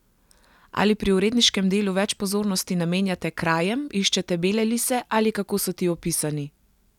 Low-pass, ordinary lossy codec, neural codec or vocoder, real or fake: 19.8 kHz; none; none; real